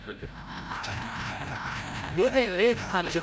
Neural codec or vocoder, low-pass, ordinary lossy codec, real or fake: codec, 16 kHz, 0.5 kbps, FreqCodec, larger model; none; none; fake